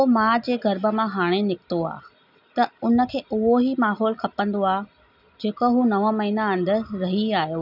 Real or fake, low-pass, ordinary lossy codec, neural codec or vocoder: real; 5.4 kHz; none; none